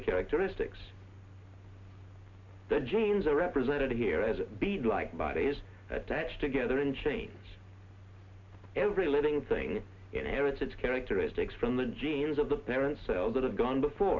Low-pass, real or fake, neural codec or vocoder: 7.2 kHz; real; none